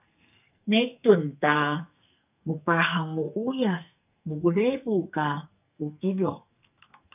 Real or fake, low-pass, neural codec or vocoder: fake; 3.6 kHz; codec, 44.1 kHz, 2.6 kbps, SNAC